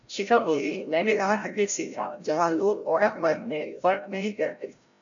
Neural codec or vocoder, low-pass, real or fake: codec, 16 kHz, 0.5 kbps, FreqCodec, larger model; 7.2 kHz; fake